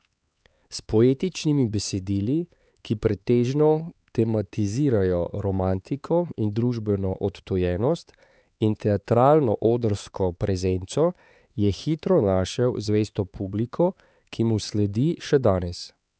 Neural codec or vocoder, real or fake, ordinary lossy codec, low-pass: codec, 16 kHz, 4 kbps, X-Codec, HuBERT features, trained on LibriSpeech; fake; none; none